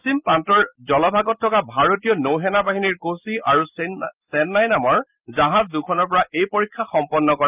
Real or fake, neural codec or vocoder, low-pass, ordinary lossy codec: real; none; 3.6 kHz; Opus, 24 kbps